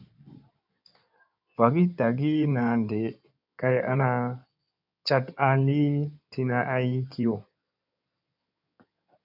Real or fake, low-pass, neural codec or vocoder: fake; 5.4 kHz; codec, 16 kHz in and 24 kHz out, 2.2 kbps, FireRedTTS-2 codec